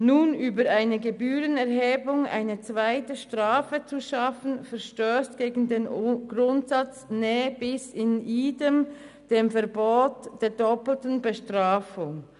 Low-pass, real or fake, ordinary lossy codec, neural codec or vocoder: 10.8 kHz; real; none; none